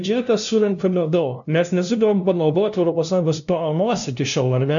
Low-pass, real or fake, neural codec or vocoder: 7.2 kHz; fake; codec, 16 kHz, 0.5 kbps, FunCodec, trained on LibriTTS, 25 frames a second